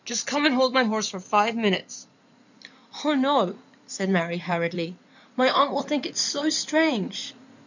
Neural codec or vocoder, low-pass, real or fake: codec, 16 kHz in and 24 kHz out, 2.2 kbps, FireRedTTS-2 codec; 7.2 kHz; fake